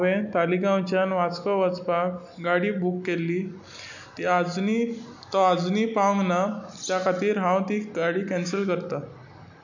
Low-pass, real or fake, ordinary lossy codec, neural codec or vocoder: 7.2 kHz; real; none; none